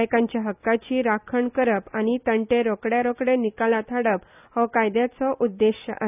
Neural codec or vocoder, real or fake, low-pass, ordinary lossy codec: none; real; 3.6 kHz; none